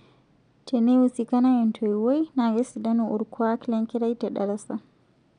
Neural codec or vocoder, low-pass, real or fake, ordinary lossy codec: none; 9.9 kHz; real; none